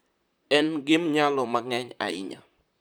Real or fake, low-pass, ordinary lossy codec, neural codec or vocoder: fake; none; none; vocoder, 44.1 kHz, 128 mel bands, Pupu-Vocoder